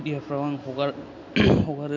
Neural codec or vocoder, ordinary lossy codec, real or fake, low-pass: none; AAC, 48 kbps; real; 7.2 kHz